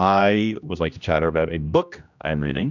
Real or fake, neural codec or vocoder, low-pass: fake; codec, 16 kHz, 1 kbps, X-Codec, HuBERT features, trained on general audio; 7.2 kHz